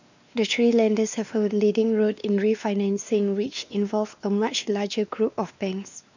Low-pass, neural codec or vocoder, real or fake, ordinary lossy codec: 7.2 kHz; codec, 16 kHz, 2 kbps, X-Codec, WavLM features, trained on Multilingual LibriSpeech; fake; none